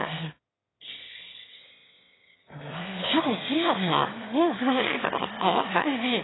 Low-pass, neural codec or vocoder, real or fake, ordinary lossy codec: 7.2 kHz; autoencoder, 22.05 kHz, a latent of 192 numbers a frame, VITS, trained on one speaker; fake; AAC, 16 kbps